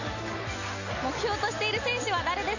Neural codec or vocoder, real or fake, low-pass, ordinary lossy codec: none; real; 7.2 kHz; none